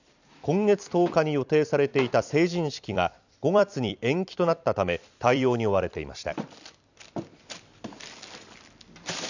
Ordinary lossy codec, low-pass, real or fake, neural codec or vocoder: none; 7.2 kHz; fake; vocoder, 44.1 kHz, 128 mel bands every 256 samples, BigVGAN v2